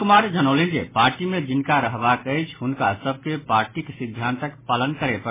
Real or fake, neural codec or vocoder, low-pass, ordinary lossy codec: real; none; 3.6 kHz; MP3, 16 kbps